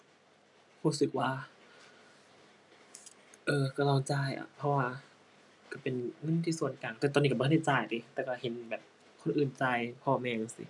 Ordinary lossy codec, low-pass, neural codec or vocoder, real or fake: none; 10.8 kHz; none; real